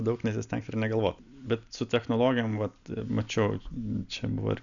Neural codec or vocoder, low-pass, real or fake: none; 7.2 kHz; real